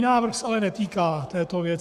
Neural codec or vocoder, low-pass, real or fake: codec, 44.1 kHz, 7.8 kbps, Pupu-Codec; 14.4 kHz; fake